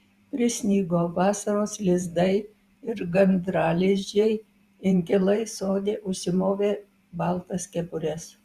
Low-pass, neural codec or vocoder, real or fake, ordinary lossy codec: 14.4 kHz; vocoder, 44.1 kHz, 128 mel bands every 512 samples, BigVGAN v2; fake; Opus, 64 kbps